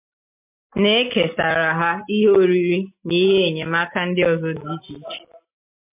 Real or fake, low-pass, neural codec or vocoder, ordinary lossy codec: real; 3.6 kHz; none; MP3, 32 kbps